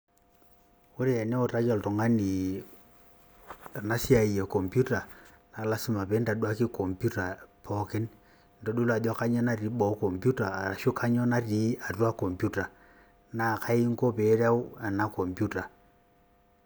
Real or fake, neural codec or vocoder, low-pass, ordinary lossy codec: real; none; none; none